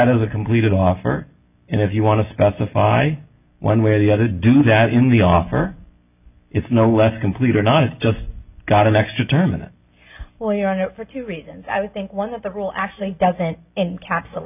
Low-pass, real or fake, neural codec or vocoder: 3.6 kHz; real; none